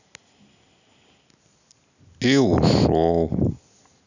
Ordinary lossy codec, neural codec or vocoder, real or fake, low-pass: none; none; real; 7.2 kHz